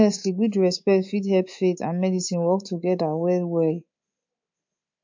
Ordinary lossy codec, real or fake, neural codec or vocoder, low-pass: MP3, 48 kbps; fake; codec, 24 kHz, 3.1 kbps, DualCodec; 7.2 kHz